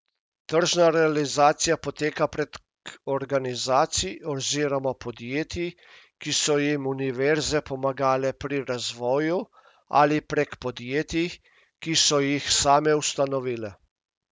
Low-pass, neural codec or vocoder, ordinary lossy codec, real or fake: none; none; none; real